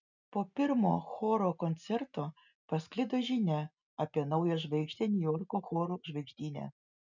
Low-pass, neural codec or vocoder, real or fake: 7.2 kHz; none; real